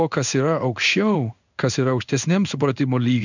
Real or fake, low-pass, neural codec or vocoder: fake; 7.2 kHz; codec, 16 kHz in and 24 kHz out, 1 kbps, XY-Tokenizer